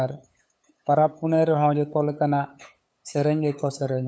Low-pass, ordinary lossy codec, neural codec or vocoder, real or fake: none; none; codec, 16 kHz, 8 kbps, FunCodec, trained on LibriTTS, 25 frames a second; fake